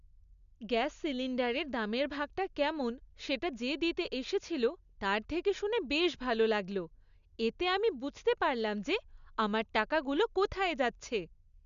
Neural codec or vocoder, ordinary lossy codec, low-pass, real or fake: none; none; 7.2 kHz; real